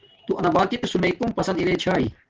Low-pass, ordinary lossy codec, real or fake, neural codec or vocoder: 7.2 kHz; Opus, 16 kbps; real; none